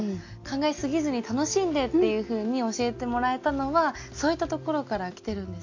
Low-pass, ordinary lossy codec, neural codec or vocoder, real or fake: 7.2 kHz; AAC, 48 kbps; none; real